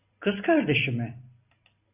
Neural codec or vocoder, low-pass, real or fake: vocoder, 44.1 kHz, 128 mel bands every 256 samples, BigVGAN v2; 3.6 kHz; fake